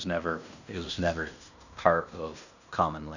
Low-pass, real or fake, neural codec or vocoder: 7.2 kHz; fake; codec, 16 kHz in and 24 kHz out, 0.9 kbps, LongCat-Audio-Codec, fine tuned four codebook decoder